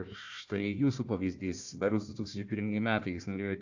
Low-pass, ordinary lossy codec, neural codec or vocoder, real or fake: 7.2 kHz; AAC, 48 kbps; codec, 16 kHz, 1 kbps, FunCodec, trained on Chinese and English, 50 frames a second; fake